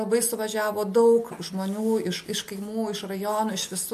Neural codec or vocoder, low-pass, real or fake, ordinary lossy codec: none; 14.4 kHz; real; MP3, 64 kbps